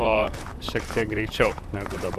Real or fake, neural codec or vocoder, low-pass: fake; vocoder, 44.1 kHz, 128 mel bands, Pupu-Vocoder; 14.4 kHz